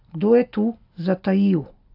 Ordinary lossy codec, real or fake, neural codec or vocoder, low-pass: AAC, 48 kbps; real; none; 5.4 kHz